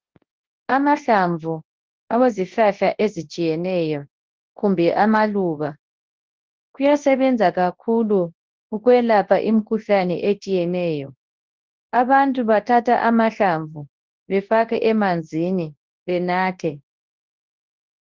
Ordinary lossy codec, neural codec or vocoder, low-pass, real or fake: Opus, 16 kbps; codec, 24 kHz, 0.9 kbps, WavTokenizer, large speech release; 7.2 kHz; fake